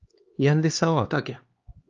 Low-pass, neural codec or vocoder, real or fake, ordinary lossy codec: 7.2 kHz; codec, 16 kHz, 2 kbps, X-Codec, HuBERT features, trained on LibriSpeech; fake; Opus, 32 kbps